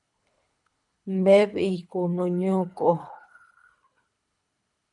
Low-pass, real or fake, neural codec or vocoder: 10.8 kHz; fake; codec, 24 kHz, 3 kbps, HILCodec